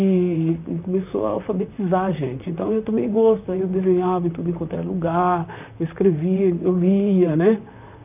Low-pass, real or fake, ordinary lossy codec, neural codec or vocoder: 3.6 kHz; fake; none; vocoder, 44.1 kHz, 128 mel bands, Pupu-Vocoder